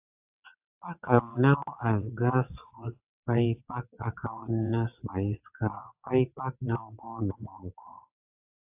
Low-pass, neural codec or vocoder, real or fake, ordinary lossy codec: 3.6 kHz; vocoder, 22.05 kHz, 80 mel bands, WaveNeXt; fake; AAC, 32 kbps